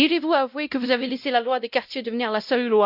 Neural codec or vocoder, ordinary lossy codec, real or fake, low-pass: codec, 16 kHz, 0.5 kbps, X-Codec, WavLM features, trained on Multilingual LibriSpeech; none; fake; 5.4 kHz